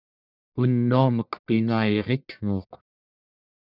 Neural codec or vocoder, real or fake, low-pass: codec, 44.1 kHz, 1.7 kbps, Pupu-Codec; fake; 5.4 kHz